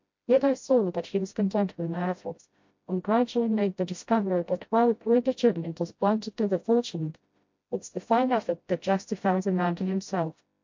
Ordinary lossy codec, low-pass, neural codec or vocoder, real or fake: MP3, 48 kbps; 7.2 kHz; codec, 16 kHz, 0.5 kbps, FreqCodec, smaller model; fake